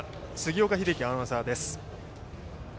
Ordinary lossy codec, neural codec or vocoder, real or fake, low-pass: none; none; real; none